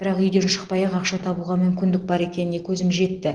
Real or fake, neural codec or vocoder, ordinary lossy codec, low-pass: real; none; Opus, 16 kbps; 9.9 kHz